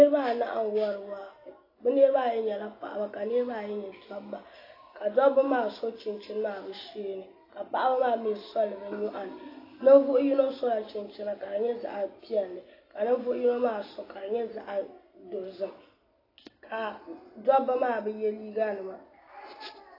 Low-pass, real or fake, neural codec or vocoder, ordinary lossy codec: 5.4 kHz; real; none; AAC, 24 kbps